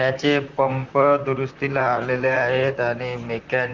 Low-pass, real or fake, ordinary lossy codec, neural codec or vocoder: 7.2 kHz; fake; Opus, 32 kbps; vocoder, 44.1 kHz, 128 mel bands, Pupu-Vocoder